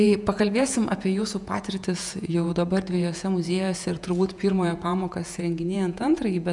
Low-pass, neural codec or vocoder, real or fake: 10.8 kHz; vocoder, 48 kHz, 128 mel bands, Vocos; fake